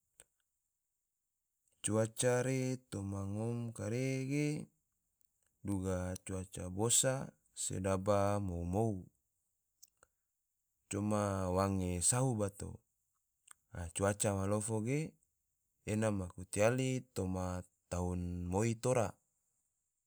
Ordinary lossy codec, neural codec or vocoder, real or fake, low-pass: none; none; real; none